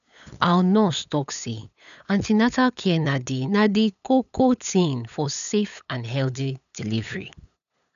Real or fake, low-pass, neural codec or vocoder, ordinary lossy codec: fake; 7.2 kHz; codec, 16 kHz, 8 kbps, FunCodec, trained on LibriTTS, 25 frames a second; none